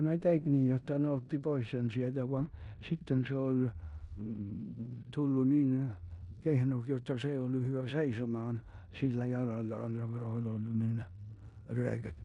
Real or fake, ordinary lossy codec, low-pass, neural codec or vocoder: fake; MP3, 96 kbps; 10.8 kHz; codec, 16 kHz in and 24 kHz out, 0.9 kbps, LongCat-Audio-Codec, four codebook decoder